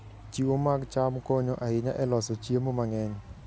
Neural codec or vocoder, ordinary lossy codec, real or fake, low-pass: none; none; real; none